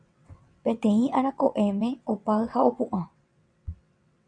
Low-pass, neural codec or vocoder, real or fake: 9.9 kHz; vocoder, 22.05 kHz, 80 mel bands, WaveNeXt; fake